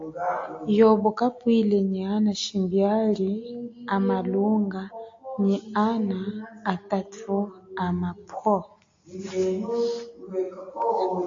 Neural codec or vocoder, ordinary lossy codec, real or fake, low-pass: none; AAC, 48 kbps; real; 7.2 kHz